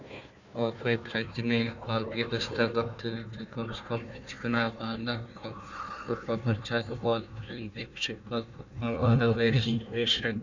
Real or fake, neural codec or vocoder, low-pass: fake; codec, 16 kHz, 1 kbps, FunCodec, trained on Chinese and English, 50 frames a second; 7.2 kHz